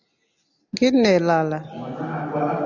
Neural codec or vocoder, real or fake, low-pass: none; real; 7.2 kHz